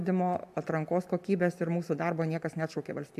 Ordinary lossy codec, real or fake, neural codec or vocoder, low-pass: AAC, 96 kbps; real; none; 14.4 kHz